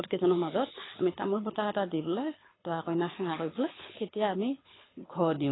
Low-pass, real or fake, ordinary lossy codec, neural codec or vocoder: 7.2 kHz; fake; AAC, 16 kbps; codec, 24 kHz, 6 kbps, HILCodec